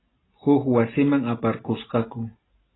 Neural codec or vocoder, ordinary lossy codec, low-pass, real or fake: none; AAC, 16 kbps; 7.2 kHz; real